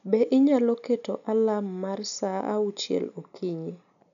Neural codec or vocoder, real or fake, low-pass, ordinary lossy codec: none; real; 7.2 kHz; none